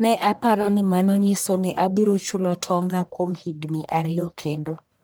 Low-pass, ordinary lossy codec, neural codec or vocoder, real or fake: none; none; codec, 44.1 kHz, 1.7 kbps, Pupu-Codec; fake